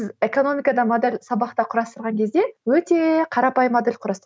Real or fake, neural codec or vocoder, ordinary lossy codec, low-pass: real; none; none; none